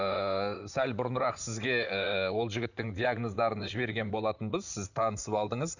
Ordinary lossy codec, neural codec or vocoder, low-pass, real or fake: none; vocoder, 44.1 kHz, 128 mel bands, Pupu-Vocoder; 7.2 kHz; fake